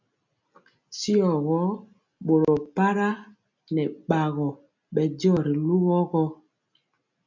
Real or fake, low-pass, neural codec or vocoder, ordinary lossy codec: real; 7.2 kHz; none; MP3, 64 kbps